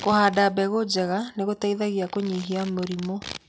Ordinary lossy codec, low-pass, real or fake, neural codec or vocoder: none; none; real; none